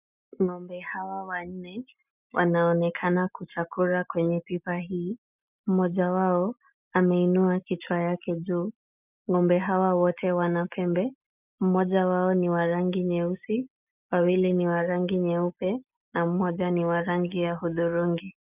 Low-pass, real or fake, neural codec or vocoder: 3.6 kHz; real; none